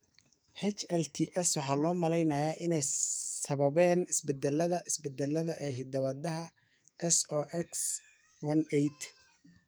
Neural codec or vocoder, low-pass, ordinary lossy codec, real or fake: codec, 44.1 kHz, 2.6 kbps, SNAC; none; none; fake